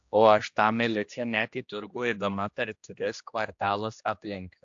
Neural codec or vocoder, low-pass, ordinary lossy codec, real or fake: codec, 16 kHz, 1 kbps, X-Codec, HuBERT features, trained on general audio; 7.2 kHz; AAC, 64 kbps; fake